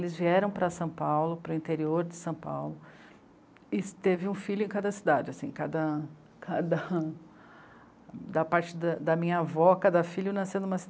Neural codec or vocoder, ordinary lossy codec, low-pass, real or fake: none; none; none; real